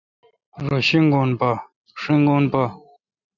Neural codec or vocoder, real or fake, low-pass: none; real; 7.2 kHz